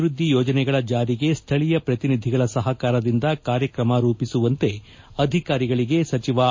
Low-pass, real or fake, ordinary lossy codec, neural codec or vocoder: 7.2 kHz; real; MP3, 32 kbps; none